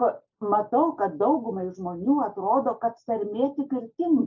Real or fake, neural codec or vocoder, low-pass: real; none; 7.2 kHz